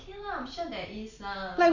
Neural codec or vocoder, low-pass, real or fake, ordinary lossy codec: none; 7.2 kHz; real; none